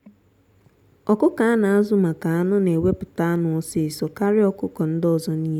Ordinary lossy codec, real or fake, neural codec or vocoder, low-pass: none; real; none; 19.8 kHz